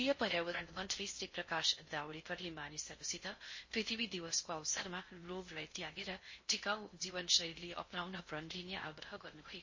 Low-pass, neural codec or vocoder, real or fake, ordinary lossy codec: 7.2 kHz; codec, 16 kHz in and 24 kHz out, 0.6 kbps, FocalCodec, streaming, 4096 codes; fake; MP3, 32 kbps